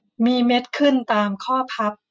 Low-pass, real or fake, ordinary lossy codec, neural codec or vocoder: none; real; none; none